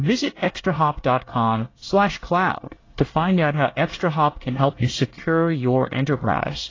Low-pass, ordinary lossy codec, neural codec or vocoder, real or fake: 7.2 kHz; AAC, 32 kbps; codec, 24 kHz, 1 kbps, SNAC; fake